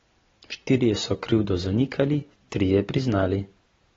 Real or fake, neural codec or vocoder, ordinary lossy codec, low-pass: real; none; AAC, 24 kbps; 7.2 kHz